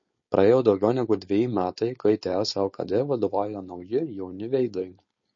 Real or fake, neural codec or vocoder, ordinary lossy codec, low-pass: fake; codec, 16 kHz, 4.8 kbps, FACodec; MP3, 32 kbps; 7.2 kHz